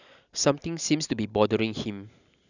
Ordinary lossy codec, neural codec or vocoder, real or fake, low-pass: none; none; real; 7.2 kHz